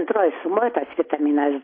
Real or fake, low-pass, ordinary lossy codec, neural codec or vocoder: real; 5.4 kHz; MP3, 24 kbps; none